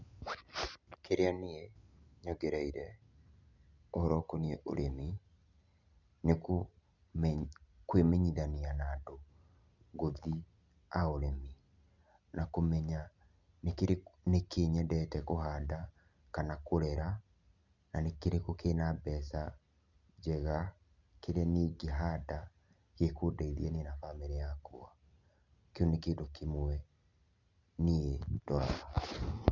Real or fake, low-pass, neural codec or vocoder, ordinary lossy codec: real; 7.2 kHz; none; none